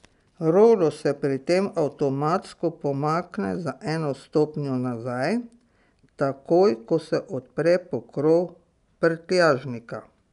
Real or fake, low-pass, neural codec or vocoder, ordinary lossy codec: real; 10.8 kHz; none; none